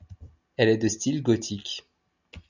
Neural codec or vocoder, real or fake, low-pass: none; real; 7.2 kHz